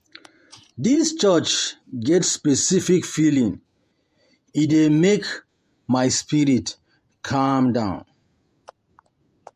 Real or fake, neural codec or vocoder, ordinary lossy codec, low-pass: fake; vocoder, 48 kHz, 128 mel bands, Vocos; MP3, 64 kbps; 14.4 kHz